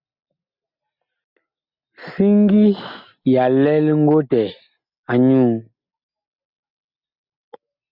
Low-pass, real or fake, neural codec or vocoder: 5.4 kHz; real; none